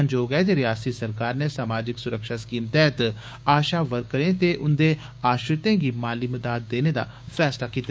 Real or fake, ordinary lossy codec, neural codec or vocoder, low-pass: fake; none; codec, 16 kHz, 6 kbps, DAC; none